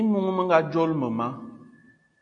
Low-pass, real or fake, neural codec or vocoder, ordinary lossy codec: 9.9 kHz; real; none; AAC, 64 kbps